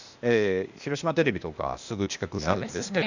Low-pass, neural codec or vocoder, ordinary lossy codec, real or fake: 7.2 kHz; codec, 16 kHz, 0.8 kbps, ZipCodec; none; fake